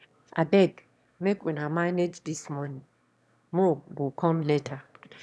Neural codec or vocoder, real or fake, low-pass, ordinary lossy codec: autoencoder, 22.05 kHz, a latent of 192 numbers a frame, VITS, trained on one speaker; fake; none; none